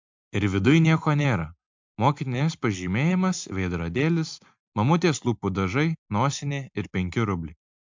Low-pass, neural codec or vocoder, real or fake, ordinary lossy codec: 7.2 kHz; none; real; MP3, 64 kbps